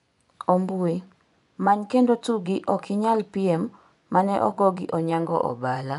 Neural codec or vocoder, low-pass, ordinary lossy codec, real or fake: vocoder, 24 kHz, 100 mel bands, Vocos; 10.8 kHz; none; fake